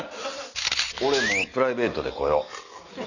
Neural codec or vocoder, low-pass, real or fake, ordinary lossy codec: none; 7.2 kHz; real; none